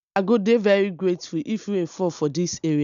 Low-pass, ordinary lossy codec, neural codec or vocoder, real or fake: 7.2 kHz; MP3, 96 kbps; none; real